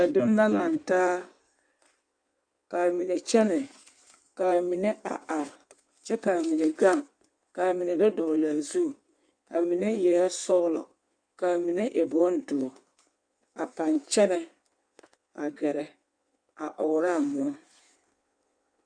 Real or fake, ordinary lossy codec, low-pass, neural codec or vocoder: fake; Opus, 64 kbps; 9.9 kHz; codec, 16 kHz in and 24 kHz out, 1.1 kbps, FireRedTTS-2 codec